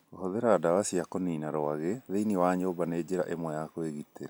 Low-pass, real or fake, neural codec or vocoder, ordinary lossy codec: none; real; none; none